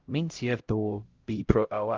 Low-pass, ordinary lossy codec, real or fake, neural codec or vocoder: 7.2 kHz; Opus, 16 kbps; fake; codec, 16 kHz, 0.5 kbps, X-Codec, HuBERT features, trained on LibriSpeech